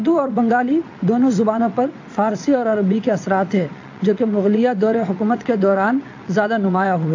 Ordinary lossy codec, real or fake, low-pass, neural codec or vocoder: AAC, 48 kbps; fake; 7.2 kHz; vocoder, 22.05 kHz, 80 mel bands, WaveNeXt